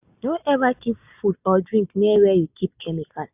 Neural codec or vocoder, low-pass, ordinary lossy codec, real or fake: none; 3.6 kHz; AAC, 32 kbps; real